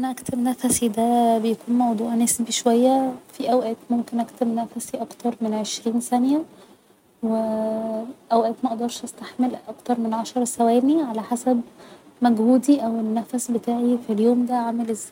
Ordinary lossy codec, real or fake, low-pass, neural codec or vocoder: none; real; 19.8 kHz; none